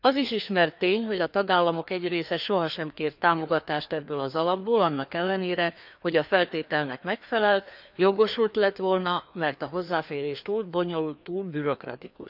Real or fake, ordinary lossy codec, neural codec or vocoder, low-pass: fake; none; codec, 16 kHz, 2 kbps, FreqCodec, larger model; 5.4 kHz